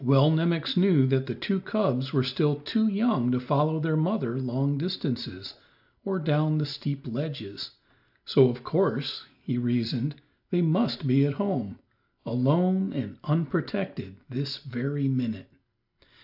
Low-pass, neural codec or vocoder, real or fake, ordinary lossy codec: 5.4 kHz; none; real; AAC, 48 kbps